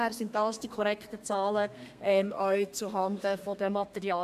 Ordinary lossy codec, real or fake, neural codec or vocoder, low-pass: MP3, 96 kbps; fake; codec, 32 kHz, 1.9 kbps, SNAC; 14.4 kHz